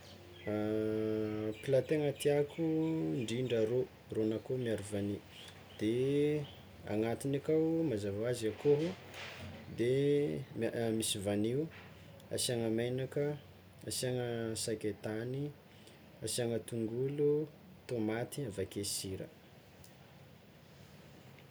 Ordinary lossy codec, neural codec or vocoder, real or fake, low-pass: none; none; real; none